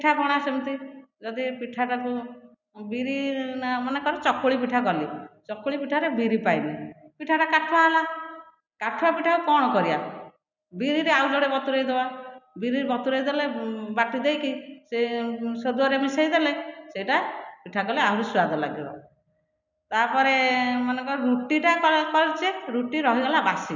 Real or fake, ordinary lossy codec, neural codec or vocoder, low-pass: real; none; none; 7.2 kHz